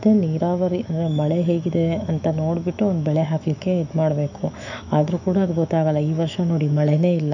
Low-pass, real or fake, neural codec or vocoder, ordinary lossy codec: 7.2 kHz; fake; autoencoder, 48 kHz, 128 numbers a frame, DAC-VAE, trained on Japanese speech; none